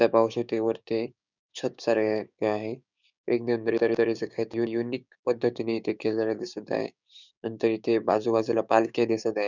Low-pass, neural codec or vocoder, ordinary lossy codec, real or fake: 7.2 kHz; codec, 16 kHz, 6 kbps, DAC; none; fake